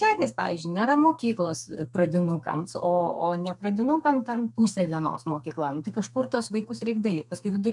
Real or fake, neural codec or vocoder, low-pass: fake; codec, 32 kHz, 1.9 kbps, SNAC; 10.8 kHz